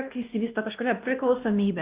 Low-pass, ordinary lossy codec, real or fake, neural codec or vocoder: 3.6 kHz; Opus, 24 kbps; fake; codec, 16 kHz, 1 kbps, X-Codec, WavLM features, trained on Multilingual LibriSpeech